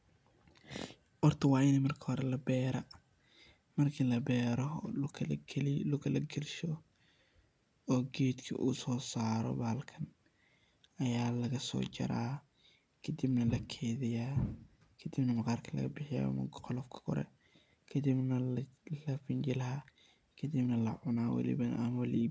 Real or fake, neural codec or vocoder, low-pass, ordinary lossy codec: real; none; none; none